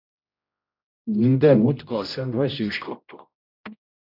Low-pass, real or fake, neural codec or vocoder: 5.4 kHz; fake; codec, 16 kHz, 0.5 kbps, X-Codec, HuBERT features, trained on general audio